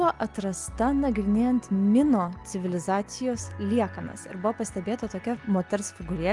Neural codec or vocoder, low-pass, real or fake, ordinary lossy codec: none; 10.8 kHz; real; Opus, 32 kbps